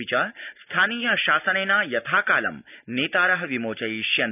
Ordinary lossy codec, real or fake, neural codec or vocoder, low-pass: none; real; none; 3.6 kHz